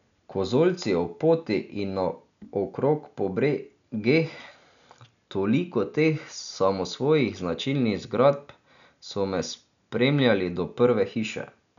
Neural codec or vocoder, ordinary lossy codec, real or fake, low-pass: none; none; real; 7.2 kHz